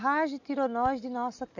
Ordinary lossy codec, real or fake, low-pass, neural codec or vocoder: none; real; 7.2 kHz; none